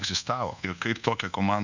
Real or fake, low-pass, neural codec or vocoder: fake; 7.2 kHz; codec, 24 kHz, 1.2 kbps, DualCodec